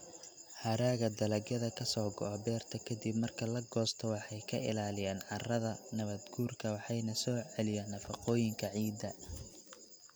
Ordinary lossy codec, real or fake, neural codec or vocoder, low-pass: none; real; none; none